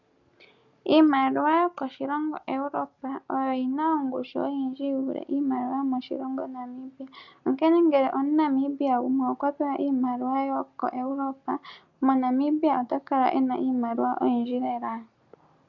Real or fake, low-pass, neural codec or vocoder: real; 7.2 kHz; none